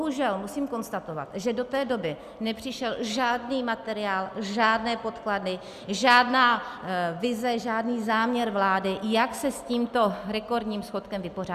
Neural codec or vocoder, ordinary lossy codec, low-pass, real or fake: none; Opus, 64 kbps; 14.4 kHz; real